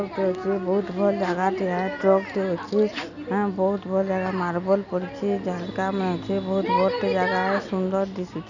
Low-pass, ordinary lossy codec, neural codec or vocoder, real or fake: 7.2 kHz; none; none; real